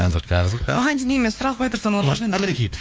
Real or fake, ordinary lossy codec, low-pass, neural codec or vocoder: fake; none; none; codec, 16 kHz, 2 kbps, X-Codec, WavLM features, trained on Multilingual LibriSpeech